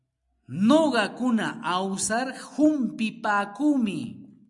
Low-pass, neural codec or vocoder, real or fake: 10.8 kHz; none; real